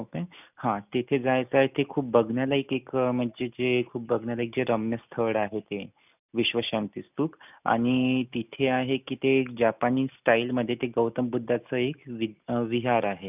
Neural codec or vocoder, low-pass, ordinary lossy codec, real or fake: none; 3.6 kHz; none; real